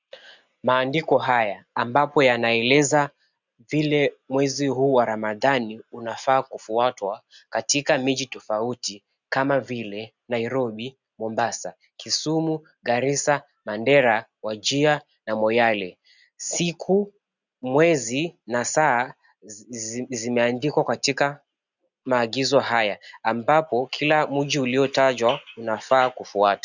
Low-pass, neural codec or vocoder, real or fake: 7.2 kHz; none; real